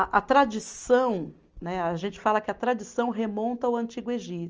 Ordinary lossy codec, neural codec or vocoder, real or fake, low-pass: Opus, 24 kbps; none; real; 7.2 kHz